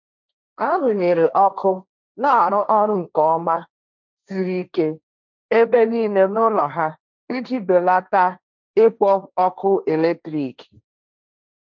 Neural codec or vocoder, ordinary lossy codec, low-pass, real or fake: codec, 16 kHz, 1.1 kbps, Voila-Tokenizer; none; 7.2 kHz; fake